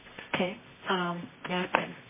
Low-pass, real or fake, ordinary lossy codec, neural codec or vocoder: 3.6 kHz; fake; MP3, 16 kbps; codec, 24 kHz, 0.9 kbps, WavTokenizer, medium music audio release